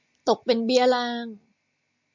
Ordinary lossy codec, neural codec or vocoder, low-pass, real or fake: MP3, 64 kbps; none; 7.2 kHz; real